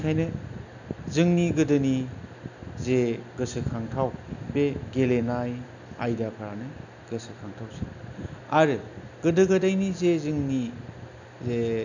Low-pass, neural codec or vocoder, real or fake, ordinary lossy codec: 7.2 kHz; none; real; none